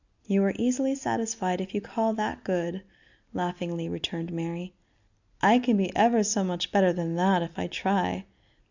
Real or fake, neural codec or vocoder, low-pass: real; none; 7.2 kHz